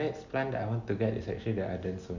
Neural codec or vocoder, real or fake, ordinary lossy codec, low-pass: none; real; none; 7.2 kHz